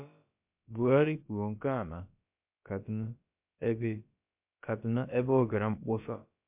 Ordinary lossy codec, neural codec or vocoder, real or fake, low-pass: MP3, 32 kbps; codec, 16 kHz, about 1 kbps, DyCAST, with the encoder's durations; fake; 3.6 kHz